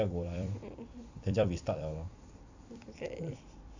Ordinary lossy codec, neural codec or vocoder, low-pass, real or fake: AAC, 48 kbps; vocoder, 44.1 kHz, 128 mel bands every 256 samples, BigVGAN v2; 7.2 kHz; fake